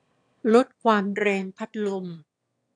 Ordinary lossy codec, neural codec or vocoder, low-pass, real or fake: AAC, 64 kbps; autoencoder, 22.05 kHz, a latent of 192 numbers a frame, VITS, trained on one speaker; 9.9 kHz; fake